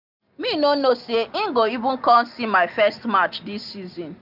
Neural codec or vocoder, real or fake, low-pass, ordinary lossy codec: none; real; 5.4 kHz; none